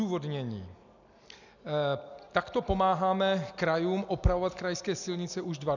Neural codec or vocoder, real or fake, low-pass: none; real; 7.2 kHz